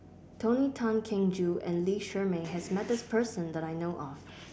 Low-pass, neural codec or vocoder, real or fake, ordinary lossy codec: none; none; real; none